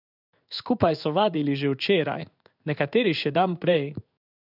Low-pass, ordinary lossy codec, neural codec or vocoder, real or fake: 5.4 kHz; none; codec, 16 kHz in and 24 kHz out, 1 kbps, XY-Tokenizer; fake